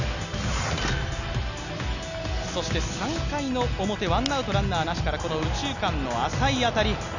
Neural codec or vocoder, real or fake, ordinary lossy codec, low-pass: none; real; none; 7.2 kHz